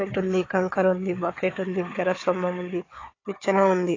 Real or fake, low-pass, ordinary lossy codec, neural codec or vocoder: fake; 7.2 kHz; AAC, 32 kbps; codec, 16 kHz, 4 kbps, FunCodec, trained on Chinese and English, 50 frames a second